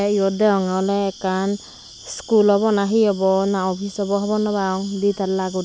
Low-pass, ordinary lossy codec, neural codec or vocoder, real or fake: none; none; none; real